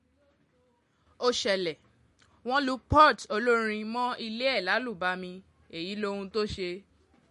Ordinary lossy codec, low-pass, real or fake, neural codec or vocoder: MP3, 48 kbps; 14.4 kHz; real; none